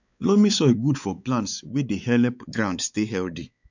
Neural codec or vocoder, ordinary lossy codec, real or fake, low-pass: codec, 16 kHz, 4 kbps, X-Codec, WavLM features, trained on Multilingual LibriSpeech; none; fake; 7.2 kHz